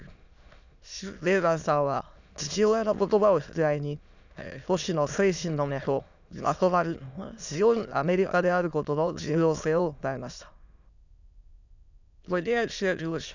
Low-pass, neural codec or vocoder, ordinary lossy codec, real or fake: 7.2 kHz; autoencoder, 22.05 kHz, a latent of 192 numbers a frame, VITS, trained on many speakers; none; fake